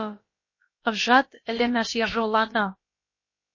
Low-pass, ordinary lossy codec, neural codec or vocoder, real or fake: 7.2 kHz; MP3, 32 kbps; codec, 16 kHz, about 1 kbps, DyCAST, with the encoder's durations; fake